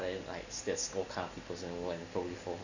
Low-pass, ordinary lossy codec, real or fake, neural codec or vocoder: 7.2 kHz; none; real; none